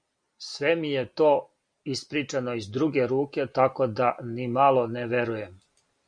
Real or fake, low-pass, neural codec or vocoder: real; 9.9 kHz; none